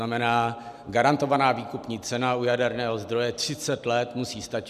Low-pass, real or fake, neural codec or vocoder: 14.4 kHz; real; none